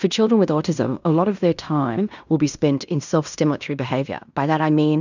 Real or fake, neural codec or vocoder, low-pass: fake; codec, 16 kHz in and 24 kHz out, 0.9 kbps, LongCat-Audio-Codec, fine tuned four codebook decoder; 7.2 kHz